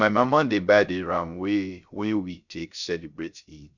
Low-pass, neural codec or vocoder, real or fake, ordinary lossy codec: 7.2 kHz; codec, 16 kHz, 0.3 kbps, FocalCodec; fake; none